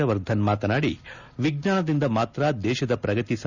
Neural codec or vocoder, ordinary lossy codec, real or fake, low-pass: none; none; real; 7.2 kHz